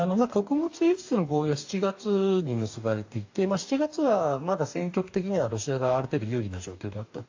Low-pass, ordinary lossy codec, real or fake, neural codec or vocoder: 7.2 kHz; AAC, 48 kbps; fake; codec, 44.1 kHz, 2.6 kbps, DAC